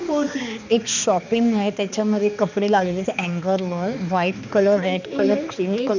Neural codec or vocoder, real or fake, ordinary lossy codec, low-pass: codec, 16 kHz, 2 kbps, X-Codec, HuBERT features, trained on balanced general audio; fake; none; 7.2 kHz